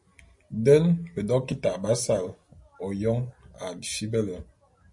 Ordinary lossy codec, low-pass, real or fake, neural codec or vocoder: MP3, 48 kbps; 10.8 kHz; real; none